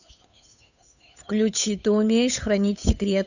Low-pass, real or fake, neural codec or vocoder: 7.2 kHz; fake; codec, 16 kHz, 8 kbps, FunCodec, trained on Chinese and English, 25 frames a second